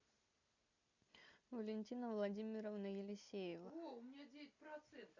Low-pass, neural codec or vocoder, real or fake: 7.2 kHz; none; real